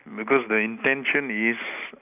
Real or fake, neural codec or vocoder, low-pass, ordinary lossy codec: real; none; 3.6 kHz; none